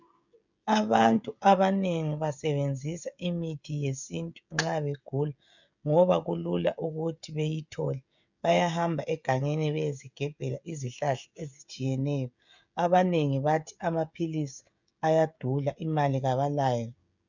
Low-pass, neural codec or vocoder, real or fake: 7.2 kHz; codec, 16 kHz, 16 kbps, FreqCodec, smaller model; fake